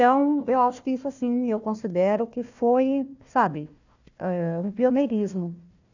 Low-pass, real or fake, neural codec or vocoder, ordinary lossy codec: 7.2 kHz; fake; codec, 16 kHz, 1 kbps, FunCodec, trained on Chinese and English, 50 frames a second; none